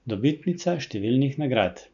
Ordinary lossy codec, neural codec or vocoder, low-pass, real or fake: none; none; 7.2 kHz; real